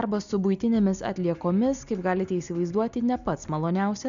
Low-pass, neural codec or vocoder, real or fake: 7.2 kHz; none; real